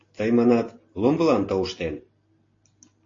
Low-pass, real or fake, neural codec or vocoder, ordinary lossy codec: 7.2 kHz; real; none; AAC, 32 kbps